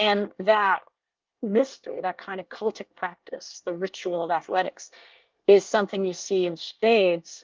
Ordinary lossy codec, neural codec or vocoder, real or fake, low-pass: Opus, 32 kbps; codec, 24 kHz, 1 kbps, SNAC; fake; 7.2 kHz